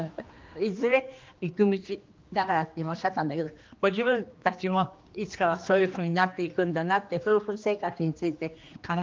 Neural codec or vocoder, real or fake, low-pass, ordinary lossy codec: codec, 16 kHz, 2 kbps, X-Codec, HuBERT features, trained on general audio; fake; 7.2 kHz; Opus, 24 kbps